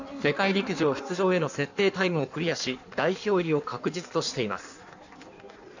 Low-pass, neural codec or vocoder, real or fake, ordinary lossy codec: 7.2 kHz; codec, 16 kHz in and 24 kHz out, 1.1 kbps, FireRedTTS-2 codec; fake; none